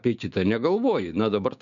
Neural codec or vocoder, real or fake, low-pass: codec, 16 kHz, 6 kbps, DAC; fake; 7.2 kHz